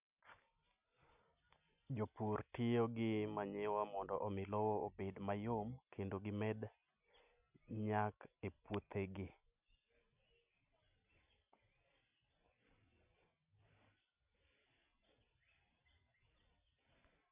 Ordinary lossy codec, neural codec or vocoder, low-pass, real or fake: none; none; 3.6 kHz; real